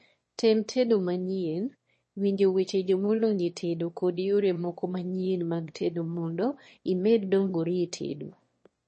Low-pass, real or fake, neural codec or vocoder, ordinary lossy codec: 9.9 kHz; fake; autoencoder, 22.05 kHz, a latent of 192 numbers a frame, VITS, trained on one speaker; MP3, 32 kbps